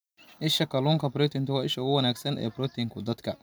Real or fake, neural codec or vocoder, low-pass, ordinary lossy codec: real; none; none; none